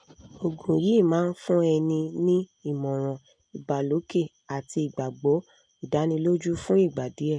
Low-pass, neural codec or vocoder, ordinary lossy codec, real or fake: 9.9 kHz; none; none; real